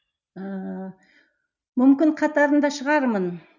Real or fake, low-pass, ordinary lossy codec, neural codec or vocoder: real; 7.2 kHz; none; none